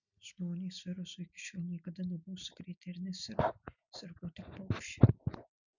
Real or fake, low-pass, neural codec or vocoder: real; 7.2 kHz; none